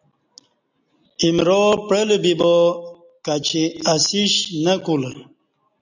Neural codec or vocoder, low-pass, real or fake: none; 7.2 kHz; real